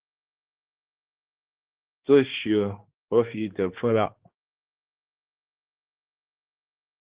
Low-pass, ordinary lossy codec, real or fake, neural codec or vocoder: 3.6 kHz; Opus, 16 kbps; fake; codec, 16 kHz, 2 kbps, X-Codec, HuBERT features, trained on balanced general audio